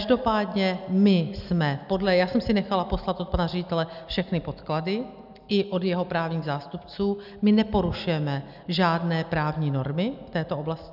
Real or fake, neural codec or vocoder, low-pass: real; none; 5.4 kHz